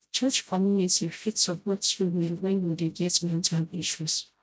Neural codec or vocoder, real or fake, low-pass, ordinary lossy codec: codec, 16 kHz, 0.5 kbps, FreqCodec, smaller model; fake; none; none